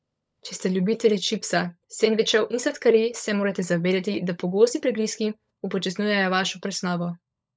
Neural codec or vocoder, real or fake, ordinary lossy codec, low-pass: codec, 16 kHz, 16 kbps, FunCodec, trained on LibriTTS, 50 frames a second; fake; none; none